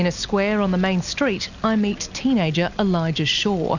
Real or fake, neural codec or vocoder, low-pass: real; none; 7.2 kHz